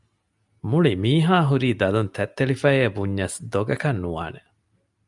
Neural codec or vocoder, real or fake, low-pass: none; real; 10.8 kHz